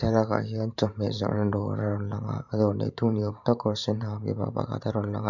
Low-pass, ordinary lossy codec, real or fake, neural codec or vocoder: 7.2 kHz; none; fake; vocoder, 44.1 kHz, 128 mel bands every 512 samples, BigVGAN v2